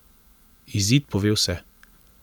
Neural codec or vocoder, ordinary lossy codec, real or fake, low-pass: vocoder, 44.1 kHz, 128 mel bands every 512 samples, BigVGAN v2; none; fake; none